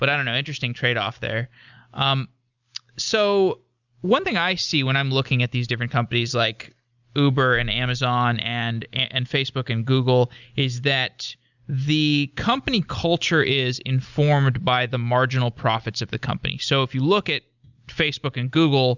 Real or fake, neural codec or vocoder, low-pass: real; none; 7.2 kHz